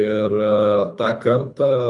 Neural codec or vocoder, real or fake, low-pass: codec, 24 kHz, 3 kbps, HILCodec; fake; 10.8 kHz